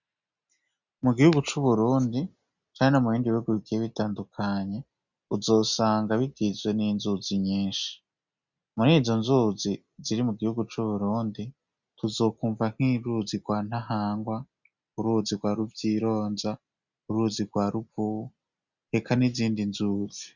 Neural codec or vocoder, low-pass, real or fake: none; 7.2 kHz; real